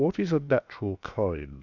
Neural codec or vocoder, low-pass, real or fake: codec, 16 kHz, 0.7 kbps, FocalCodec; 7.2 kHz; fake